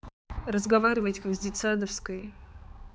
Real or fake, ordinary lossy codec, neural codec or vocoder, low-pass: fake; none; codec, 16 kHz, 4 kbps, X-Codec, HuBERT features, trained on balanced general audio; none